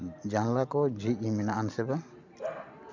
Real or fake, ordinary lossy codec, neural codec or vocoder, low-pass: real; MP3, 64 kbps; none; 7.2 kHz